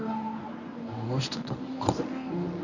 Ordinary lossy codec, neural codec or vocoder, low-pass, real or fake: none; codec, 24 kHz, 0.9 kbps, WavTokenizer, medium speech release version 1; 7.2 kHz; fake